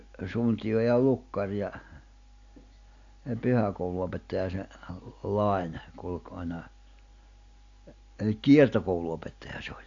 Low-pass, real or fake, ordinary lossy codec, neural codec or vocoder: 7.2 kHz; real; AAC, 48 kbps; none